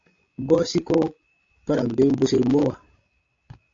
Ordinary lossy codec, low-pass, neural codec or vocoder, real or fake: AAC, 48 kbps; 7.2 kHz; codec, 16 kHz, 16 kbps, FreqCodec, larger model; fake